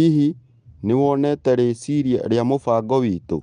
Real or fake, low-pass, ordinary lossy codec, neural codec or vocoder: real; 9.9 kHz; Opus, 32 kbps; none